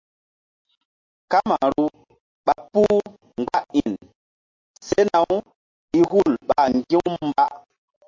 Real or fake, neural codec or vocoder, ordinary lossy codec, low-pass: real; none; MP3, 48 kbps; 7.2 kHz